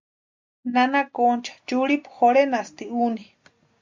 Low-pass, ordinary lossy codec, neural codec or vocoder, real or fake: 7.2 kHz; MP3, 64 kbps; none; real